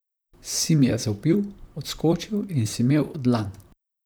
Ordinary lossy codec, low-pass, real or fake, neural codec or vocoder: none; none; fake; vocoder, 44.1 kHz, 128 mel bands, Pupu-Vocoder